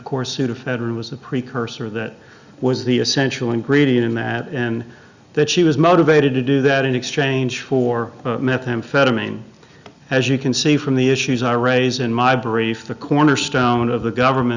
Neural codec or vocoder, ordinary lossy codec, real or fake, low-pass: none; Opus, 64 kbps; real; 7.2 kHz